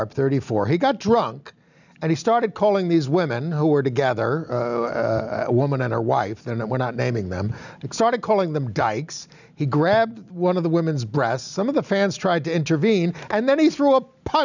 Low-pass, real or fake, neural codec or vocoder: 7.2 kHz; real; none